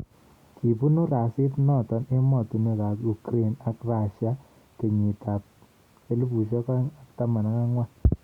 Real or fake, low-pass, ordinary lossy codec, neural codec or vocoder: real; 19.8 kHz; Opus, 64 kbps; none